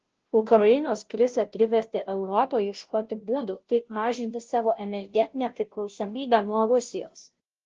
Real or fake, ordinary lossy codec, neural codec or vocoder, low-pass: fake; Opus, 16 kbps; codec, 16 kHz, 0.5 kbps, FunCodec, trained on Chinese and English, 25 frames a second; 7.2 kHz